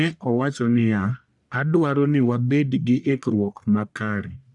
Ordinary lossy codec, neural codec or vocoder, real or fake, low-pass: none; codec, 44.1 kHz, 1.7 kbps, Pupu-Codec; fake; 10.8 kHz